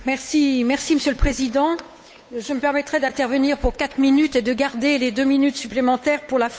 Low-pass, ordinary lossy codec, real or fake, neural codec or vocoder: none; none; fake; codec, 16 kHz, 8 kbps, FunCodec, trained on Chinese and English, 25 frames a second